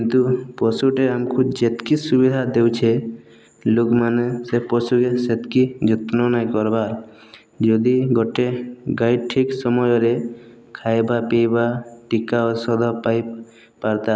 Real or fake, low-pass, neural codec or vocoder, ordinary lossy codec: real; none; none; none